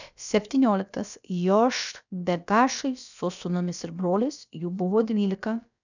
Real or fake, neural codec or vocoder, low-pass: fake; codec, 16 kHz, about 1 kbps, DyCAST, with the encoder's durations; 7.2 kHz